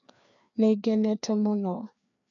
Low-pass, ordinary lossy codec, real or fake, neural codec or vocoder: 7.2 kHz; none; fake; codec, 16 kHz, 2 kbps, FreqCodec, larger model